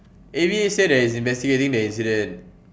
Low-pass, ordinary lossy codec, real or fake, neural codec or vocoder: none; none; real; none